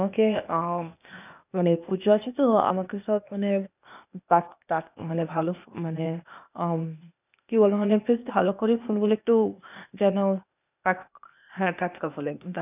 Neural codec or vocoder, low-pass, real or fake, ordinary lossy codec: codec, 16 kHz, 0.8 kbps, ZipCodec; 3.6 kHz; fake; none